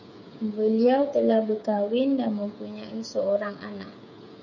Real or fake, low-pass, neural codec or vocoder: fake; 7.2 kHz; codec, 16 kHz, 8 kbps, FreqCodec, smaller model